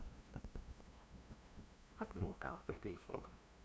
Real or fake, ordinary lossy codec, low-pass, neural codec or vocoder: fake; none; none; codec, 16 kHz, 0.5 kbps, FunCodec, trained on LibriTTS, 25 frames a second